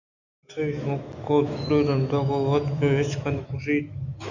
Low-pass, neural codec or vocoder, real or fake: 7.2 kHz; autoencoder, 48 kHz, 128 numbers a frame, DAC-VAE, trained on Japanese speech; fake